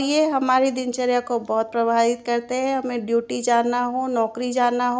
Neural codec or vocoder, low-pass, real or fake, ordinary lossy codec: none; none; real; none